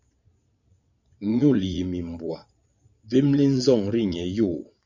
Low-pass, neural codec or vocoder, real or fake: 7.2 kHz; vocoder, 22.05 kHz, 80 mel bands, Vocos; fake